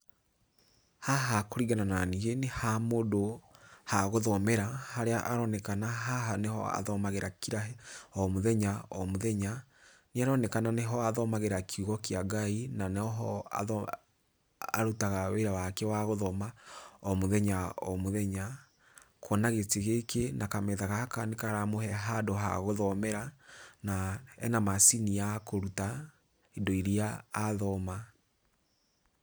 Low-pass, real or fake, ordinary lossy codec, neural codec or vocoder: none; real; none; none